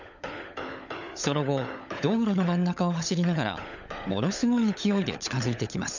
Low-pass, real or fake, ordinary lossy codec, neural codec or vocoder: 7.2 kHz; fake; none; codec, 16 kHz, 16 kbps, FunCodec, trained on LibriTTS, 50 frames a second